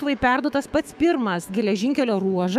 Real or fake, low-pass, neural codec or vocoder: fake; 14.4 kHz; autoencoder, 48 kHz, 128 numbers a frame, DAC-VAE, trained on Japanese speech